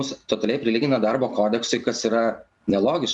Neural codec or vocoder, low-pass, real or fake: none; 10.8 kHz; real